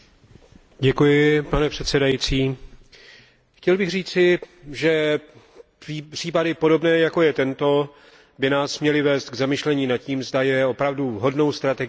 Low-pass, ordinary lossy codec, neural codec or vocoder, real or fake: none; none; none; real